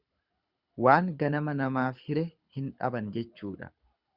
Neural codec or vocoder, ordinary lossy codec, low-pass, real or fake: codec, 24 kHz, 6 kbps, HILCodec; Opus, 64 kbps; 5.4 kHz; fake